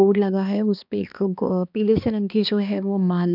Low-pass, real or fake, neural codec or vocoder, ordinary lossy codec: 5.4 kHz; fake; codec, 16 kHz, 2 kbps, X-Codec, HuBERT features, trained on balanced general audio; none